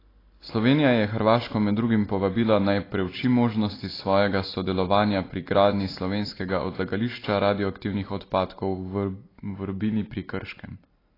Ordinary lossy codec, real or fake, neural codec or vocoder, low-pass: AAC, 24 kbps; real; none; 5.4 kHz